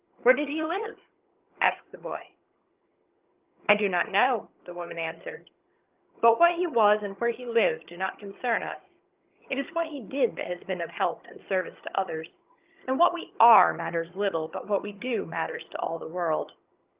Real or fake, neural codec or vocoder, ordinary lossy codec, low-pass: fake; vocoder, 22.05 kHz, 80 mel bands, HiFi-GAN; Opus, 32 kbps; 3.6 kHz